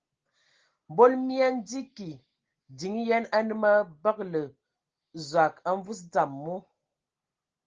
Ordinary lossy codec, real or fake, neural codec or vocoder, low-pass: Opus, 16 kbps; real; none; 7.2 kHz